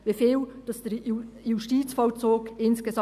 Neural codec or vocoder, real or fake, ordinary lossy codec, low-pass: none; real; none; 14.4 kHz